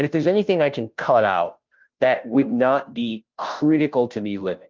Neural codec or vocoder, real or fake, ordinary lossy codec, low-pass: codec, 16 kHz, 0.5 kbps, FunCodec, trained on Chinese and English, 25 frames a second; fake; Opus, 32 kbps; 7.2 kHz